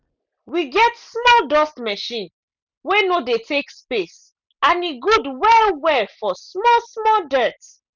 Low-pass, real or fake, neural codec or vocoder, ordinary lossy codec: 7.2 kHz; real; none; none